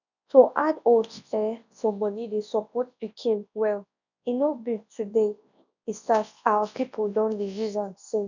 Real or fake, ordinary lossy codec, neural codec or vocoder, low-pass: fake; none; codec, 24 kHz, 0.9 kbps, WavTokenizer, large speech release; 7.2 kHz